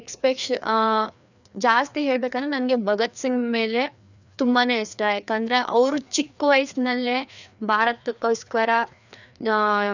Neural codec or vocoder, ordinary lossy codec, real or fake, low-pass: codec, 16 kHz, 2 kbps, FreqCodec, larger model; none; fake; 7.2 kHz